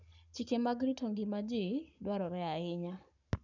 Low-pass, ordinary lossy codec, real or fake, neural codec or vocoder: 7.2 kHz; none; fake; codec, 44.1 kHz, 7.8 kbps, Pupu-Codec